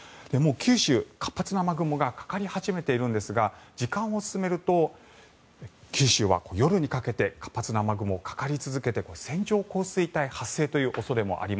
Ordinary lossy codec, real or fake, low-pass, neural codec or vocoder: none; real; none; none